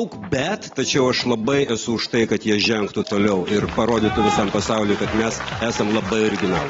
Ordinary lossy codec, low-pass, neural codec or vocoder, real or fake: AAC, 24 kbps; 7.2 kHz; none; real